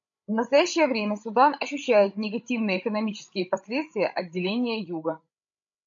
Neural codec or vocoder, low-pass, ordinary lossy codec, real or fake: codec, 16 kHz, 16 kbps, FreqCodec, larger model; 7.2 kHz; AAC, 64 kbps; fake